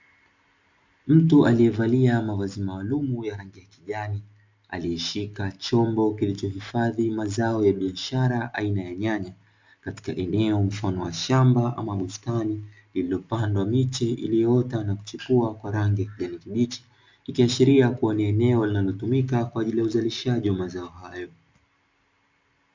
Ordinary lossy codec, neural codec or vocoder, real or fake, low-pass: MP3, 64 kbps; none; real; 7.2 kHz